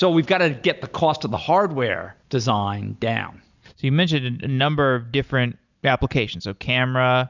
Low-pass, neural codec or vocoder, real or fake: 7.2 kHz; none; real